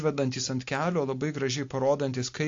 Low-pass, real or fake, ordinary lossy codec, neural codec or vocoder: 7.2 kHz; real; AAC, 48 kbps; none